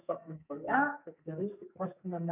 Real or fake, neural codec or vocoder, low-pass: fake; codec, 44.1 kHz, 2.6 kbps, SNAC; 3.6 kHz